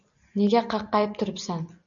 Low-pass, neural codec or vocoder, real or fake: 7.2 kHz; none; real